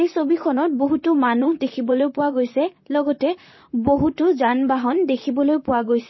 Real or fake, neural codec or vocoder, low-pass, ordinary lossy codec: fake; vocoder, 44.1 kHz, 128 mel bands, Pupu-Vocoder; 7.2 kHz; MP3, 24 kbps